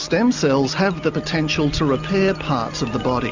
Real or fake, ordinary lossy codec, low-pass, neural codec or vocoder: real; Opus, 64 kbps; 7.2 kHz; none